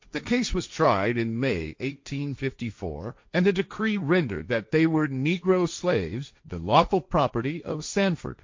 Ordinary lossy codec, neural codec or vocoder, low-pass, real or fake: MP3, 48 kbps; codec, 16 kHz, 1.1 kbps, Voila-Tokenizer; 7.2 kHz; fake